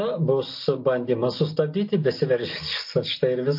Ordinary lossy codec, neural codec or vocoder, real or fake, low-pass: MP3, 32 kbps; none; real; 5.4 kHz